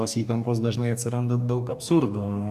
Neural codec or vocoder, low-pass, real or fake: codec, 44.1 kHz, 2.6 kbps, DAC; 14.4 kHz; fake